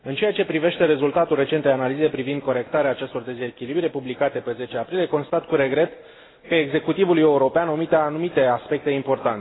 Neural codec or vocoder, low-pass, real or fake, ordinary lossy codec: none; 7.2 kHz; real; AAC, 16 kbps